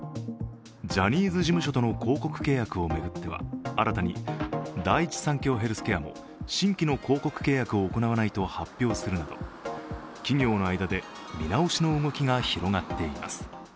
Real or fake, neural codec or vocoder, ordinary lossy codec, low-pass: real; none; none; none